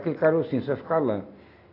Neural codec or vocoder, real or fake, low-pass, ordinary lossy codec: none; real; 5.4 kHz; AAC, 32 kbps